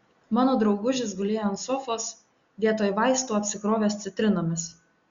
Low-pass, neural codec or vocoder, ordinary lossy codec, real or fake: 7.2 kHz; none; Opus, 64 kbps; real